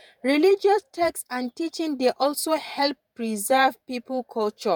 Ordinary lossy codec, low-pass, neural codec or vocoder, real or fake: none; none; vocoder, 48 kHz, 128 mel bands, Vocos; fake